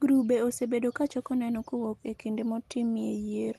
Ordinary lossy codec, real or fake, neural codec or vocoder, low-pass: Opus, 24 kbps; real; none; 14.4 kHz